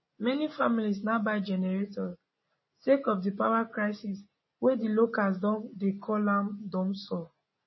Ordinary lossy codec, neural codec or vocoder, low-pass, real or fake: MP3, 24 kbps; none; 7.2 kHz; real